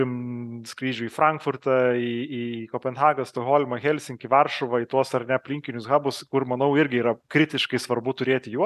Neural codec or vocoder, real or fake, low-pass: none; real; 14.4 kHz